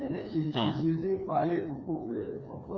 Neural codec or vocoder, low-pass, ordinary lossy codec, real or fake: codec, 16 kHz, 2 kbps, FreqCodec, larger model; none; none; fake